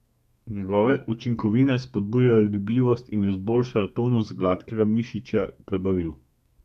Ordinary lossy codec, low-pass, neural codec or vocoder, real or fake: none; 14.4 kHz; codec, 32 kHz, 1.9 kbps, SNAC; fake